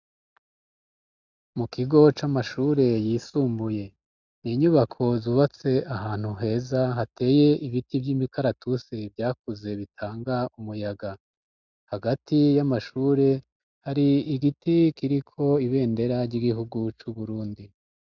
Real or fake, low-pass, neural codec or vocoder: real; 7.2 kHz; none